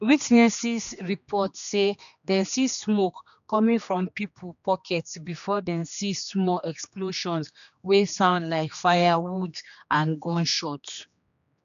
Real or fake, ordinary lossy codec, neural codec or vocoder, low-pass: fake; none; codec, 16 kHz, 2 kbps, X-Codec, HuBERT features, trained on general audio; 7.2 kHz